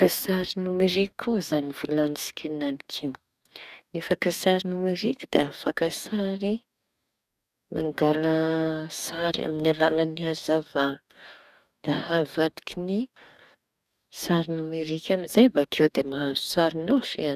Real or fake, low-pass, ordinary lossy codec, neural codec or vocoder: fake; 14.4 kHz; none; codec, 44.1 kHz, 2.6 kbps, DAC